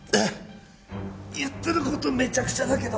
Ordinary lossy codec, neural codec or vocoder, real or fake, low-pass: none; none; real; none